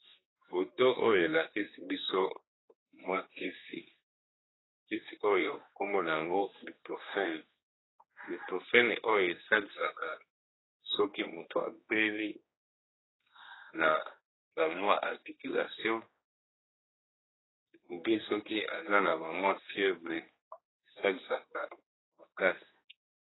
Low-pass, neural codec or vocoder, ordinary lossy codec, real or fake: 7.2 kHz; codec, 16 kHz, 2 kbps, X-Codec, HuBERT features, trained on general audio; AAC, 16 kbps; fake